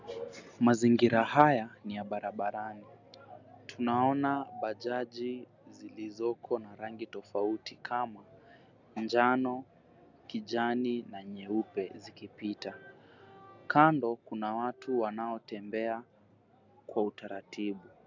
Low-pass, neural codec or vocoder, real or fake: 7.2 kHz; none; real